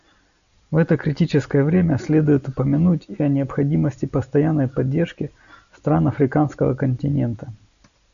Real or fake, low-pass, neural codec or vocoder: real; 7.2 kHz; none